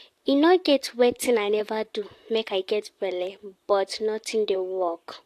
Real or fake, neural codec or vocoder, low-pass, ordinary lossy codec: fake; vocoder, 44.1 kHz, 128 mel bands, Pupu-Vocoder; 14.4 kHz; AAC, 64 kbps